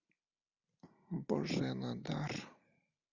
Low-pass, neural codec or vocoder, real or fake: 7.2 kHz; none; real